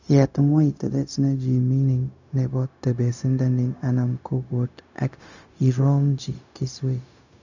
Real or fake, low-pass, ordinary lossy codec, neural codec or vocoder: fake; 7.2 kHz; none; codec, 16 kHz, 0.4 kbps, LongCat-Audio-Codec